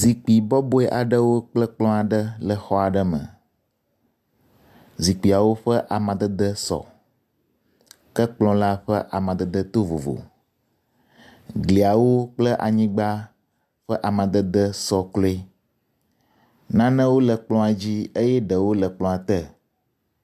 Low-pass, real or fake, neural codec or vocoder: 14.4 kHz; real; none